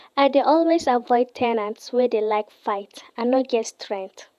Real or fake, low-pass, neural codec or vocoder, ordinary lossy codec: fake; 14.4 kHz; vocoder, 48 kHz, 128 mel bands, Vocos; none